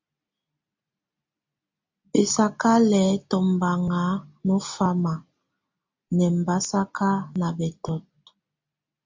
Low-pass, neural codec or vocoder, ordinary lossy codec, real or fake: 7.2 kHz; none; MP3, 64 kbps; real